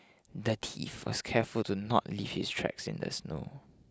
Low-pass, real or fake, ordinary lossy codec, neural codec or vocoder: none; real; none; none